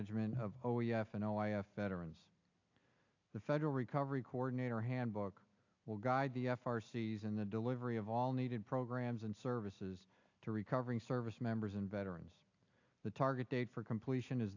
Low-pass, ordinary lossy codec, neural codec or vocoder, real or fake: 7.2 kHz; AAC, 48 kbps; none; real